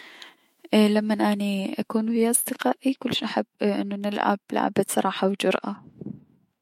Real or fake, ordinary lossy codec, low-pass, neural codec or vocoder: fake; MP3, 64 kbps; 19.8 kHz; autoencoder, 48 kHz, 128 numbers a frame, DAC-VAE, trained on Japanese speech